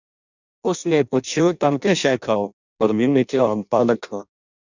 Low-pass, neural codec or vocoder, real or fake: 7.2 kHz; codec, 16 kHz in and 24 kHz out, 0.6 kbps, FireRedTTS-2 codec; fake